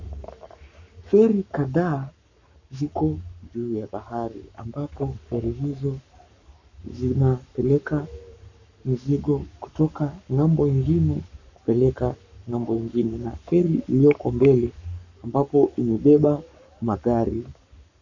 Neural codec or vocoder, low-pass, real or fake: codec, 44.1 kHz, 7.8 kbps, Pupu-Codec; 7.2 kHz; fake